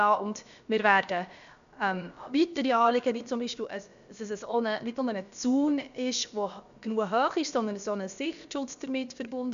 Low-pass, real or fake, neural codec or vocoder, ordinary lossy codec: 7.2 kHz; fake; codec, 16 kHz, about 1 kbps, DyCAST, with the encoder's durations; none